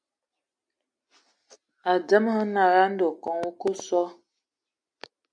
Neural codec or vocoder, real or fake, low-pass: none; real; 9.9 kHz